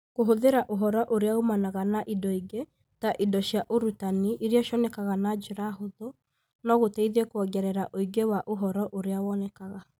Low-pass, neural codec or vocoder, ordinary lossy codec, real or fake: none; none; none; real